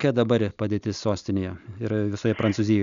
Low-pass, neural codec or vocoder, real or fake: 7.2 kHz; none; real